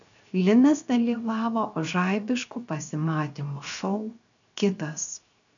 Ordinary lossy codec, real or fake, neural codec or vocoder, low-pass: MP3, 96 kbps; fake; codec, 16 kHz, 0.7 kbps, FocalCodec; 7.2 kHz